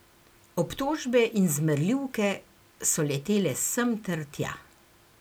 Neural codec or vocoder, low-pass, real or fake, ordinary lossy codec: none; none; real; none